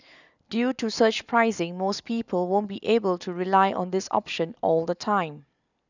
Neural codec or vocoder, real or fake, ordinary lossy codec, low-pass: none; real; none; 7.2 kHz